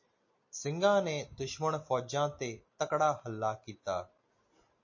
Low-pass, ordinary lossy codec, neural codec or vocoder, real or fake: 7.2 kHz; MP3, 32 kbps; none; real